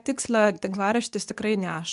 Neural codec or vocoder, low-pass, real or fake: codec, 24 kHz, 0.9 kbps, WavTokenizer, medium speech release version 2; 10.8 kHz; fake